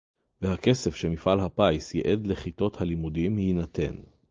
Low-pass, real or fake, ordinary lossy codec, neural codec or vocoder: 7.2 kHz; real; Opus, 24 kbps; none